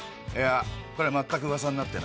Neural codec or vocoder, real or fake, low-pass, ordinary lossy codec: none; real; none; none